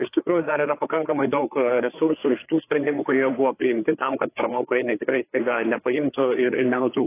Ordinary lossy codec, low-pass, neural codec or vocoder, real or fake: AAC, 24 kbps; 3.6 kHz; codec, 16 kHz, 4 kbps, FreqCodec, larger model; fake